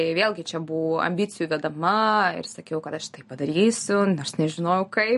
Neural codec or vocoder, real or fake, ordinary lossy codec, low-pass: none; real; MP3, 48 kbps; 14.4 kHz